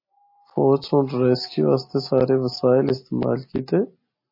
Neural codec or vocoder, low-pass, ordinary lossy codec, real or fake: none; 5.4 kHz; MP3, 24 kbps; real